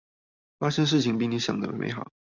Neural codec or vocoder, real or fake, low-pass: none; real; 7.2 kHz